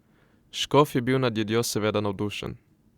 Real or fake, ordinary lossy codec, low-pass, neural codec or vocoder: real; Opus, 64 kbps; 19.8 kHz; none